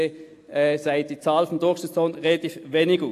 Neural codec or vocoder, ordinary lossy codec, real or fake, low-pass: none; AAC, 64 kbps; real; 14.4 kHz